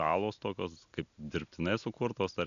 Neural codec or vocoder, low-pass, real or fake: none; 7.2 kHz; real